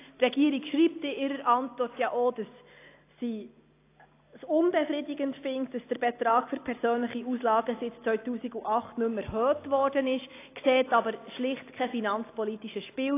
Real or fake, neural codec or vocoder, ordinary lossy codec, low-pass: real; none; AAC, 24 kbps; 3.6 kHz